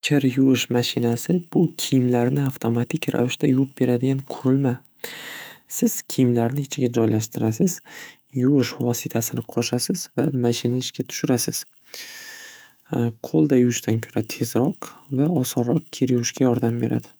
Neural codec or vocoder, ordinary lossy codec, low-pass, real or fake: autoencoder, 48 kHz, 128 numbers a frame, DAC-VAE, trained on Japanese speech; none; none; fake